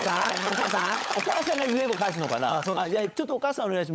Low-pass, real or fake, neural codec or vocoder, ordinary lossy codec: none; fake; codec, 16 kHz, 16 kbps, FunCodec, trained on LibriTTS, 50 frames a second; none